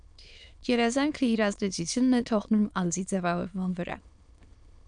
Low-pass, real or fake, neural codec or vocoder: 9.9 kHz; fake; autoencoder, 22.05 kHz, a latent of 192 numbers a frame, VITS, trained on many speakers